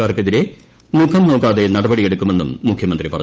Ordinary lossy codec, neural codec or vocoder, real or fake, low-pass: none; codec, 16 kHz, 8 kbps, FunCodec, trained on Chinese and English, 25 frames a second; fake; none